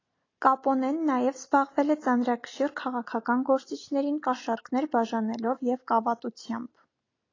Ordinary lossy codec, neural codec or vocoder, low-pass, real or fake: AAC, 32 kbps; none; 7.2 kHz; real